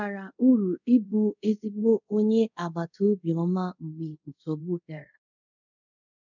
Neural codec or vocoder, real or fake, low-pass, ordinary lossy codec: codec, 24 kHz, 0.5 kbps, DualCodec; fake; 7.2 kHz; none